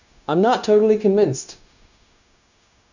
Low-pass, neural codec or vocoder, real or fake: 7.2 kHz; codec, 16 kHz, 0.9 kbps, LongCat-Audio-Codec; fake